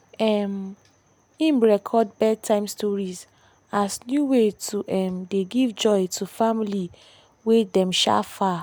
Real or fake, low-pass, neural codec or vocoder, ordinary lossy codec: real; none; none; none